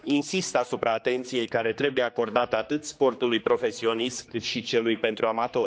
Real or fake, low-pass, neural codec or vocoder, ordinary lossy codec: fake; none; codec, 16 kHz, 2 kbps, X-Codec, HuBERT features, trained on general audio; none